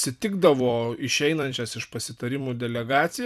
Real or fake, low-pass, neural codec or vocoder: fake; 14.4 kHz; vocoder, 44.1 kHz, 128 mel bands every 256 samples, BigVGAN v2